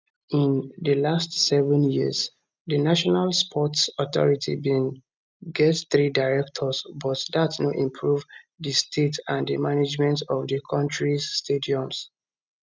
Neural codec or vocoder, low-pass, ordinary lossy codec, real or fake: none; none; none; real